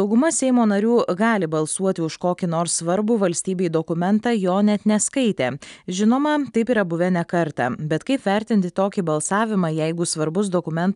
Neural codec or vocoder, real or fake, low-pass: none; real; 10.8 kHz